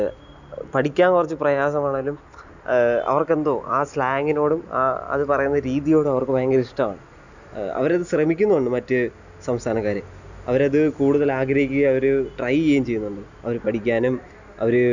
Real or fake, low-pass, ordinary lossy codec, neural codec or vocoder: real; 7.2 kHz; none; none